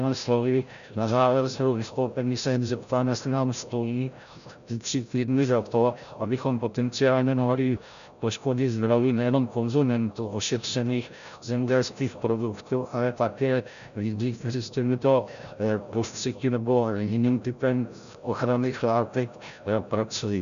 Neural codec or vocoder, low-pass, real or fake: codec, 16 kHz, 0.5 kbps, FreqCodec, larger model; 7.2 kHz; fake